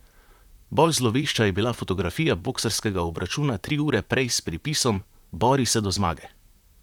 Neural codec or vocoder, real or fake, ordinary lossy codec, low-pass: vocoder, 44.1 kHz, 128 mel bands, Pupu-Vocoder; fake; none; 19.8 kHz